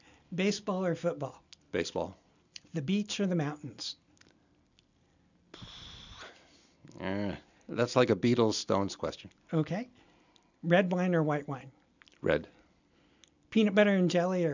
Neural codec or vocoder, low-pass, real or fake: none; 7.2 kHz; real